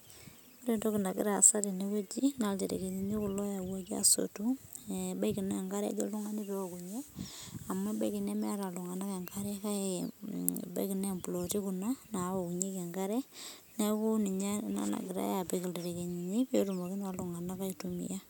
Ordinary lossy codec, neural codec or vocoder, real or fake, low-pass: none; none; real; none